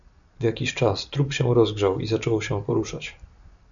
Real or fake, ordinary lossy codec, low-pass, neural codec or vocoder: real; MP3, 96 kbps; 7.2 kHz; none